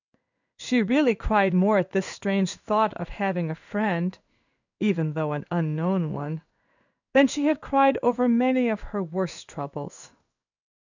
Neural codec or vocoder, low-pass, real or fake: codec, 16 kHz in and 24 kHz out, 1 kbps, XY-Tokenizer; 7.2 kHz; fake